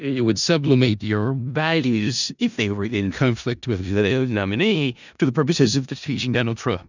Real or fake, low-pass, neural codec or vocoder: fake; 7.2 kHz; codec, 16 kHz in and 24 kHz out, 0.4 kbps, LongCat-Audio-Codec, four codebook decoder